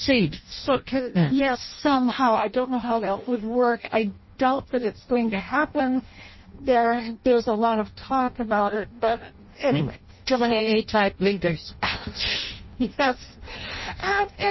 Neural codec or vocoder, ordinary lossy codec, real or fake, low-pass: codec, 16 kHz in and 24 kHz out, 0.6 kbps, FireRedTTS-2 codec; MP3, 24 kbps; fake; 7.2 kHz